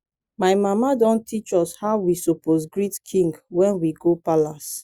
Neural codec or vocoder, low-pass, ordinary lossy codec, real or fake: none; 19.8 kHz; Opus, 64 kbps; real